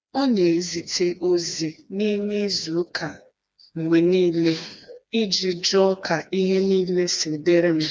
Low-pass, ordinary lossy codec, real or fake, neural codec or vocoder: none; none; fake; codec, 16 kHz, 2 kbps, FreqCodec, smaller model